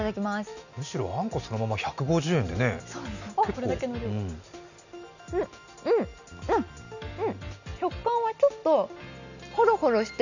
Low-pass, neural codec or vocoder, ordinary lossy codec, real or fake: 7.2 kHz; none; none; real